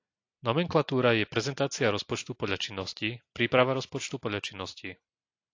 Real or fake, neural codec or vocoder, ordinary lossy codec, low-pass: real; none; AAC, 48 kbps; 7.2 kHz